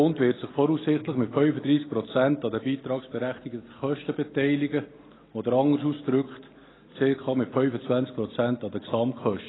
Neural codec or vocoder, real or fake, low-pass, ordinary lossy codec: none; real; 7.2 kHz; AAC, 16 kbps